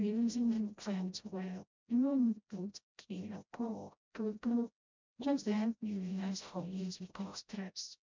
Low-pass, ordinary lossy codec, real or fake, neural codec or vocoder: 7.2 kHz; MP3, 64 kbps; fake; codec, 16 kHz, 0.5 kbps, FreqCodec, smaller model